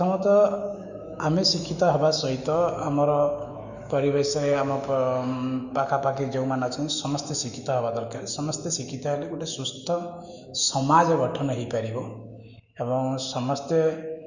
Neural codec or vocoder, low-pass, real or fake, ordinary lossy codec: autoencoder, 48 kHz, 128 numbers a frame, DAC-VAE, trained on Japanese speech; 7.2 kHz; fake; none